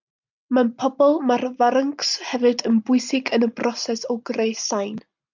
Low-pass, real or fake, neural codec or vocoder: 7.2 kHz; real; none